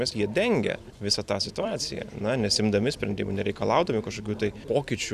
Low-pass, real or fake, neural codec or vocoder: 14.4 kHz; fake; vocoder, 44.1 kHz, 128 mel bands every 256 samples, BigVGAN v2